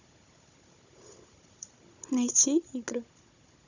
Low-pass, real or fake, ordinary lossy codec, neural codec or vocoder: 7.2 kHz; fake; none; codec, 16 kHz, 16 kbps, FunCodec, trained on Chinese and English, 50 frames a second